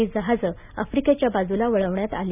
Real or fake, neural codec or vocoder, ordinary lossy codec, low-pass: real; none; none; 3.6 kHz